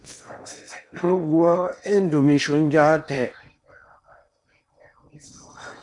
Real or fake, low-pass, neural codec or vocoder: fake; 10.8 kHz; codec, 16 kHz in and 24 kHz out, 0.8 kbps, FocalCodec, streaming, 65536 codes